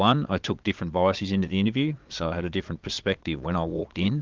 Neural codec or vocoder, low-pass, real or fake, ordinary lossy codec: vocoder, 22.05 kHz, 80 mel bands, Vocos; 7.2 kHz; fake; Opus, 32 kbps